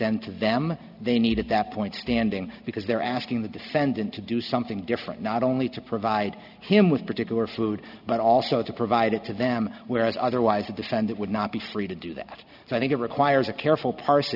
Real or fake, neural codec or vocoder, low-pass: real; none; 5.4 kHz